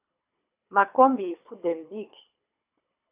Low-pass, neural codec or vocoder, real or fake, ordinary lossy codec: 3.6 kHz; codec, 24 kHz, 3 kbps, HILCodec; fake; AAC, 32 kbps